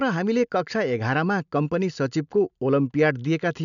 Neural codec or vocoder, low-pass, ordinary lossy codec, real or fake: none; 7.2 kHz; none; real